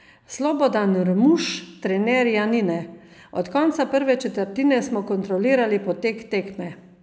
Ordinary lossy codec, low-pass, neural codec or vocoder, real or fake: none; none; none; real